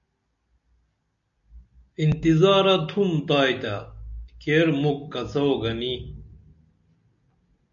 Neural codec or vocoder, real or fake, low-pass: none; real; 7.2 kHz